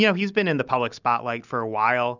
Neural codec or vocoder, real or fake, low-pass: none; real; 7.2 kHz